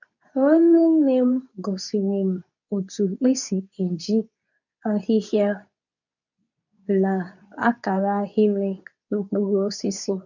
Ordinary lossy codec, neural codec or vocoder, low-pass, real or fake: none; codec, 24 kHz, 0.9 kbps, WavTokenizer, medium speech release version 2; 7.2 kHz; fake